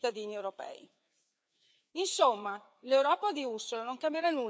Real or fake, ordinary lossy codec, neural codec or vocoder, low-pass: fake; none; codec, 16 kHz, 4 kbps, FreqCodec, larger model; none